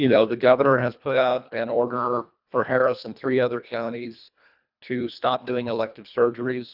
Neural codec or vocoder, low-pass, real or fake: codec, 24 kHz, 1.5 kbps, HILCodec; 5.4 kHz; fake